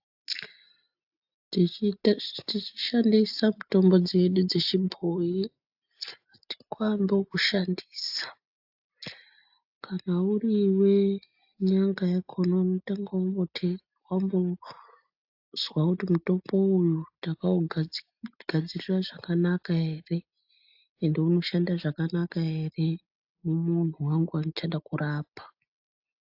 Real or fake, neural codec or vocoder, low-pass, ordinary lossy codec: real; none; 5.4 kHz; AAC, 48 kbps